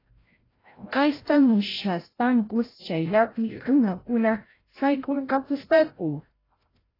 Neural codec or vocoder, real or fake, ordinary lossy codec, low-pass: codec, 16 kHz, 0.5 kbps, FreqCodec, larger model; fake; AAC, 24 kbps; 5.4 kHz